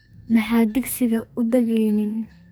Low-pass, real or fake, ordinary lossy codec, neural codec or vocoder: none; fake; none; codec, 44.1 kHz, 2.6 kbps, SNAC